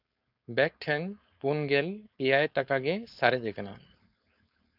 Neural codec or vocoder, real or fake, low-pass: codec, 16 kHz, 4.8 kbps, FACodec; fake; 5.4 kHz